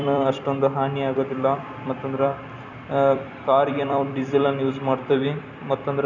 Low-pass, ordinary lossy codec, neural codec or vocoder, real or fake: 7.2 kHz; none; vocoder, 44.1 kHz, 128 mel bands every 256 samples, BigVGAN v2; fake